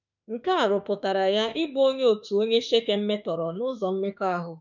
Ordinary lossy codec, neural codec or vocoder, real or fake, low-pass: none; autoencoder, 48 kHz, 32 numbers a frame, DAC-VAE, trained on Japanese speech; fake; 7.2 kHz